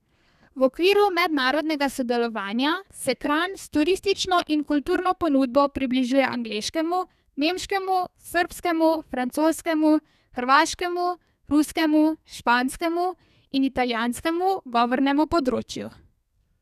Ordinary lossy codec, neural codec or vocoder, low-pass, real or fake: none; codec, 32 kHz, 1.9 kbps, SNAC; 14.4 kHz; fake